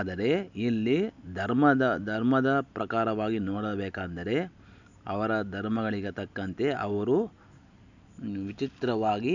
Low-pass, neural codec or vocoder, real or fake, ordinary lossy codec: 7.2 kHz; none; real; none